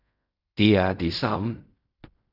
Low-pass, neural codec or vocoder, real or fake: 5.4 kHz; codec, 16 kHz in and 24 kHz out, 0.4 kbps, LongCat-Audio-Codec, fine tuned four codebook decoder; fake